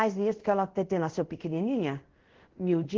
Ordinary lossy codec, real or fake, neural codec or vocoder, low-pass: Opus, 16 kbps; fake; codec, 24 kHz, 0.5 kbps, DualCodec; 7.2 kHz